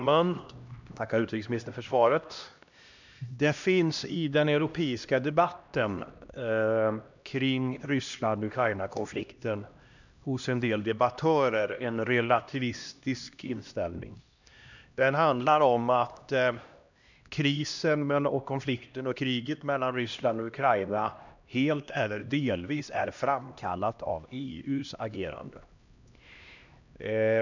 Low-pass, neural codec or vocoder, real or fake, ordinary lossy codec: 7.2 kHz; codec, 16 kHz, 1 kbps, X-Codec, HuBERT features, trained on LibriSpeech; fake; none